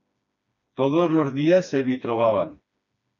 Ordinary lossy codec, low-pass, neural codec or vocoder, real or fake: MP3, 96 kbps; 7.2 kHz; codec, 16 kHz, 2 kbps, FreqCodec, smaller model; fake